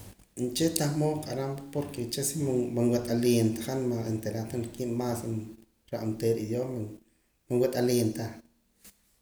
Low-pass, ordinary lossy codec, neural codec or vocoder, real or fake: none; none; none; real